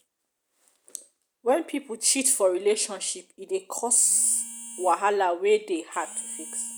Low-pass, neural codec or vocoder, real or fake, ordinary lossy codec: none; none; real; none